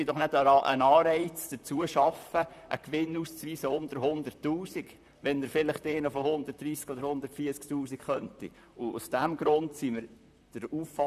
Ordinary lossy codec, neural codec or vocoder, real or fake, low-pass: none; vocoder, 44.1 kHz, 128 mel bands, Pupu-Vocoder; fake; 14.4 kHz